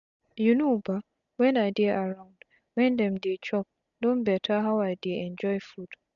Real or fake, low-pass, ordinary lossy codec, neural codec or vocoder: real; 7.2 kHz; none; none